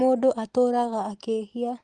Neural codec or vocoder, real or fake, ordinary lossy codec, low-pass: none; real; Opus, 24 kbps; 10.8 kHz